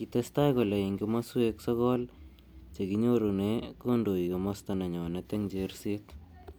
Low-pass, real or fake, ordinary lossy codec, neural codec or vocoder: none; real; none; none